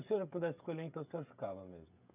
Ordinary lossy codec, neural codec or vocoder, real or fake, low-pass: none; codec, 16 kHz, 16 kbps, FreqCodec, smaller model; fake; 3.6 kHz